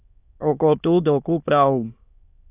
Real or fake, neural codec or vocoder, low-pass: fake; autoencoder, 22.05 kHz, a latent of 192 numbers a frame, VITS, trained on many speakers; 3.6 kHz